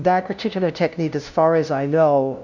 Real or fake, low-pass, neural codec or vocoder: fake; 7.2 kHz; codec, 16 kHz, 0.5 kbps, FunCodec, trained on LibriTTS, 25 frames a second